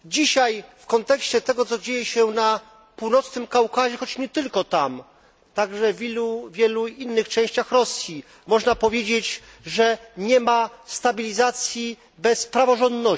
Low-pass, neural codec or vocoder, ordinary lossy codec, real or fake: none; none; none; real